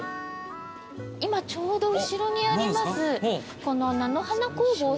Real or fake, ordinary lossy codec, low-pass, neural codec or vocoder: real; none; none; none